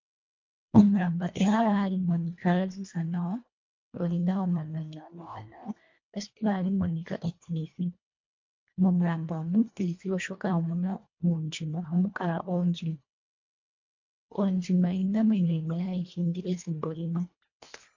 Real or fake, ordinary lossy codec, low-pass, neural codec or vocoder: fake; MP3, 48 kbps; 7.2 kHz; codec, 24 kHz, 1.5 kbps, HILCodec